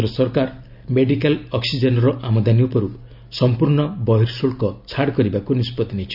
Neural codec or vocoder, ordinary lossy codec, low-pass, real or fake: none; none; 5.4 kHz; real